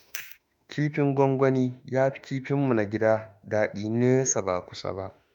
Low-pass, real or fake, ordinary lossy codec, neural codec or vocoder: 19.8 kHz; fake; none; autoencoder, 48 kHz, 32 numbers a frame, DAC-VAE, trained on Japanese speech